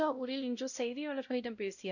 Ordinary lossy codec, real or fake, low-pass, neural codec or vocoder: none; fake; 7.2 kHz; codec, 16 kHz, 0.5 kbps, X-Codec, WavLM features, trained on Multilingual LibriSpeech